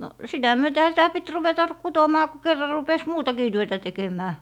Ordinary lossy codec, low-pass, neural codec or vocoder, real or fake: MP3, 96 kbps; 19.8 kHz; autoencoder, 48 kHz, 128 numbers a frame, DAC-VAE, trained on Japanese speech; fake